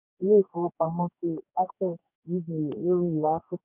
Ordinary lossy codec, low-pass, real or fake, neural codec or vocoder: none; 3.6 kHz; fake; codec, 44.1 kHz, 2.6 kbps, DAC